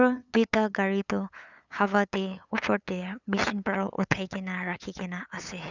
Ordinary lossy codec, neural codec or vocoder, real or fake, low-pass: none; codec, 16 kHz, 8 kbps, FunCodec, trained on LibriTTS, 25 frames a second; fake; 7.2 kHz